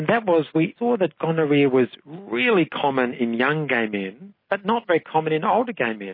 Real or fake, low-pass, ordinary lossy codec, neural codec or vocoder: real; 5.4 kHz; MP3, 24 kbps; none